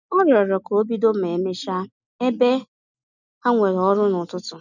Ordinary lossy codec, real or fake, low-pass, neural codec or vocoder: none; real; 7.2 kHz; none